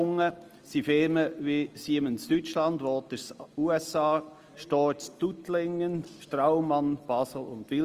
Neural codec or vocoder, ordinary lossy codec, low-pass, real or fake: none; Opus, 24 kbps; 14.4 kHz; real